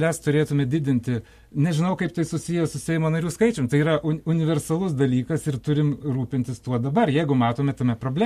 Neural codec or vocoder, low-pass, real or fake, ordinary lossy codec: none; 14.4 kHz; real; MP3, 64 kbps